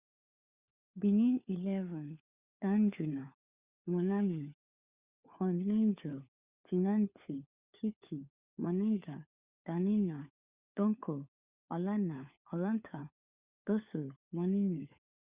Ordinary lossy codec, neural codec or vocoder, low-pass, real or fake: Opus, 64 kbps; codec, 16 kHz, 4 kbps, FunCodec, trained on LibriTTS, 50 frames a second; 3.6 kHz; fake